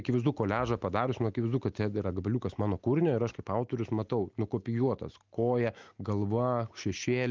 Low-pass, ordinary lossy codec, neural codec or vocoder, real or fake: 7.2 kHz; Opus, 24 kbps; none; real